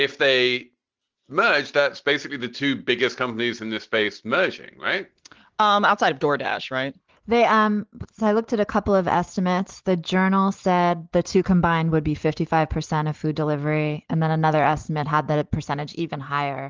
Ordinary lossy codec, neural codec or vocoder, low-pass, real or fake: Opus, 16 kbps; none; 7.2 kHz; real